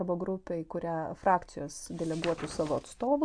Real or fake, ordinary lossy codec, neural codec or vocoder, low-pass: real; AAC, 64 kbps; none; 9.9 kHz